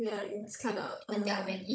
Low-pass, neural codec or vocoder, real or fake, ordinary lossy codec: none; codec, 16 kHz, 16 kbps, FunCodec, trained on Chinese and English, 50 frames a second; fake; none